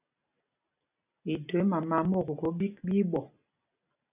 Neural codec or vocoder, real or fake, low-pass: none; real; 3.6 kHz